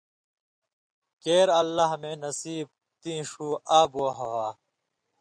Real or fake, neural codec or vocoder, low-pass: real; none; 9.9 kHz